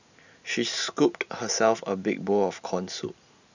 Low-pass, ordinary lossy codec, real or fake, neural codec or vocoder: 7.2 kHz; none; real; none